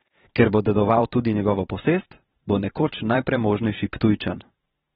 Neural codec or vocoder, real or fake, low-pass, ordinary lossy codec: vocoder, 44.1 kHz, 128 mel bands every 512 samples, BigVGAN v2; fake; 19.8 kHz; AAC, 16 kbps